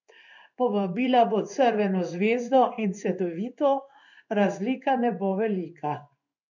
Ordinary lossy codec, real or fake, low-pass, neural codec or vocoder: none; fake; 7.2 kHz; codec, 16 kHz in and 24 kHz out, 1 kbps, XY-Tokenizer